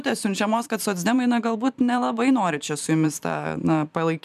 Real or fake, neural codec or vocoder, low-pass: fake; vocoder, 44.1 kHz, 128 mel bands every 512 samples, BigVGAN v2; 14.4 kHz